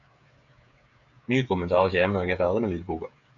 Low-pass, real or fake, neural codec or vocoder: 7.2 kHz; fake; codec, 16 kHz, 8 kbps, FreqCodec, smaller model